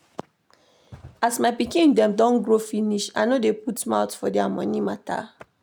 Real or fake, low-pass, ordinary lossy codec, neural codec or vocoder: real; none; none; none